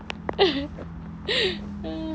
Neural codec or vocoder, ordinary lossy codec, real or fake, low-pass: none; none; real; none